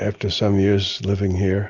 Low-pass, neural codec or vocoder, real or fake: 7.2 kHz; none; real